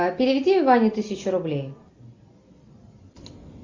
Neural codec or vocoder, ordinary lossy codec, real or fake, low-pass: none; MP3, 64 kbps; real; 7.2 kHz